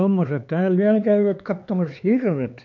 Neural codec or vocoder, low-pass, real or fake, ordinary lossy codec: codec, 16 kHz, 4 kbps, X-Codec, HuBERT features, trained on LibriSpeech; 7.2 kHz; fake; MP3, 64 kbps